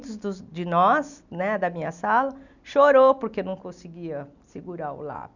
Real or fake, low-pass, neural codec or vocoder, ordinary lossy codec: real; 7.2 kHz; none; none